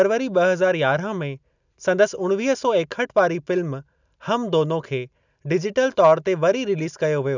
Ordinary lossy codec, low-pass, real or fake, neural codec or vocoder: none; 7.2 kHz; real; none